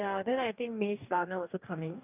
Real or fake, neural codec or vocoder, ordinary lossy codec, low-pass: fake; codec, 44.1 kHz, 2.6 kbps, DAC; none; 3.6 kHz